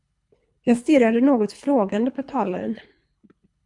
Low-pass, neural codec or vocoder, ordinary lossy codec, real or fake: 10.8 kHz; codec, 24 kHz, 3 kbps, HILCodec; MP3, 64 kbps; fake